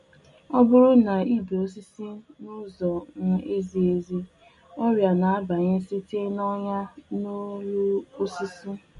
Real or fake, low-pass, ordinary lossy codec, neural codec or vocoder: real; 10.8 kHz; MP3, 48 kbps; none